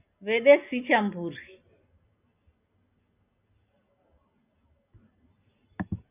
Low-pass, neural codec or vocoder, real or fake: 3.6 kHz; none; real